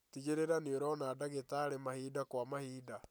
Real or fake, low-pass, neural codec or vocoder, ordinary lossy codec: real; none; none; none